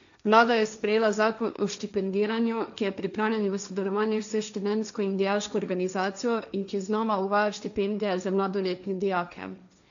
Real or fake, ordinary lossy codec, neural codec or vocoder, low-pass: fake; none; codec, 16 kHz, 1.1 kbps, Voila-Tokenizer; 7.2 kHz